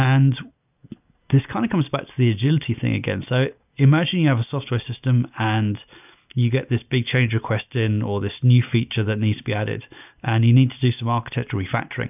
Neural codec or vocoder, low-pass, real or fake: none; 3.6 kHz; real